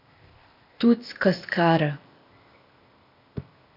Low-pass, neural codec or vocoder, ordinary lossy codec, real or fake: 5.4 kHz; codec, 16 kHz, 0.8 kbps, ZipCodec; MP3, 48 kbps; fake